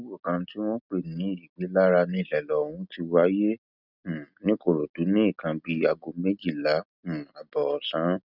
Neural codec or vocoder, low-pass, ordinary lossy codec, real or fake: none; 5.4 kHz; none; real